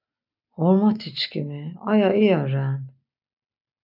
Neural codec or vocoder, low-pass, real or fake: none; 5.4 kHz; real